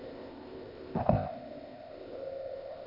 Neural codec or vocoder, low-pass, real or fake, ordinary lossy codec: codec, 16 kHz, 0.8 kbps, ZipCodec; 5.4 kHz; fake; MP3, 48 kbps